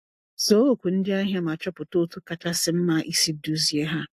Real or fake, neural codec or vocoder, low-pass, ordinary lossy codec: real; none; 14.4 kHz; AAC, 64 kbps